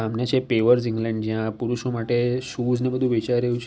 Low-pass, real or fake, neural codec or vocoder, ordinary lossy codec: none; real; none; none